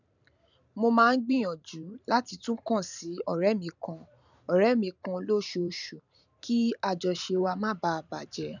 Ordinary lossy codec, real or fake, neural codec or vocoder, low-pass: none; fake; vocoder, 44.1 kHz, 128 mel bands every 512 samples, BigVGAN v2; 7.2 kHz